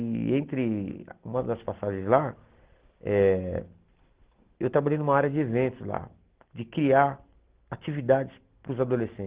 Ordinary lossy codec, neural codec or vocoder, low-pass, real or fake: Opus, 16 kbps; none; 3.6 kHz; real